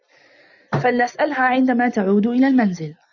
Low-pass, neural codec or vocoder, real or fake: 7.2 kHz; none; real